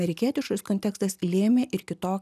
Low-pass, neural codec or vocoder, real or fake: 14.4 kHz; none; real